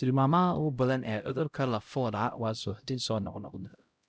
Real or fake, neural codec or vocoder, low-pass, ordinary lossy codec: fake; codec, 16 kHz, 0.5 kbps, X-Codec, HuBERT features, trained on LibriSpeech; none; none